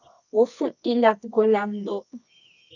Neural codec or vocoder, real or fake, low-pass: codec, 24 kHz, 0.9 kbps, WavTokenizer, medium music audio release; fake; 7.2 kHz